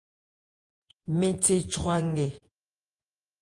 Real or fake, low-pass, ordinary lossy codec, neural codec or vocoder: fake; 10.8 kHz; Opus, 32 kbps; vocoder, 48 kHz, 128 mel bands, Vocos